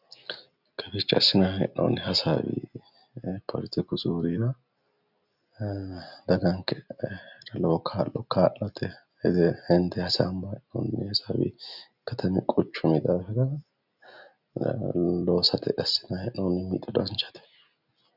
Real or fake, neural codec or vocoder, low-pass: real; none; 5.4 kHz